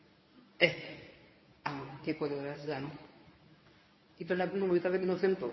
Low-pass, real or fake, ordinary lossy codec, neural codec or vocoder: 7.2 kHz; fake; MP3, 24 kbps; codec, 24 kHz, 0.9 kbps, WavTokenizer, medium speech release version 1